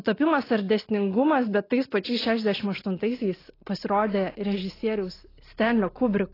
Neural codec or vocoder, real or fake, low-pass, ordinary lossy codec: none; real; 5.4 kHz; AAC, 24 kbps